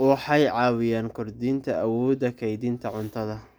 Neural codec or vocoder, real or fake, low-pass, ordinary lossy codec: none; real; none; none